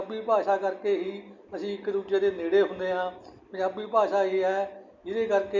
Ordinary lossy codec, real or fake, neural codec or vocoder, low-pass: none; real; none; 7.2 kHz